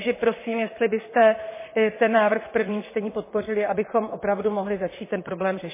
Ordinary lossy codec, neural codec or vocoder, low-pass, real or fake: MP3, 16 kbps; vocoder, 44.1 kHz, 128 mel bands, Pupu-Vocoder; 3.6 kHz; fake